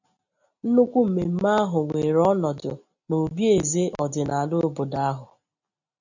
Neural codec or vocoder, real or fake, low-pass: none; real; 7.2 kHz